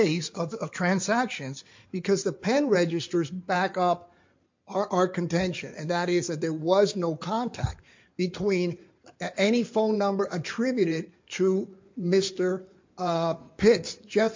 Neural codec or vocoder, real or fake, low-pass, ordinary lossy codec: codec, 16 kHz in and 24 kHz out, 2.2 kbps, FireRedTTS-2 codec; fake; 7.2 kHz; MP3, 48 kbps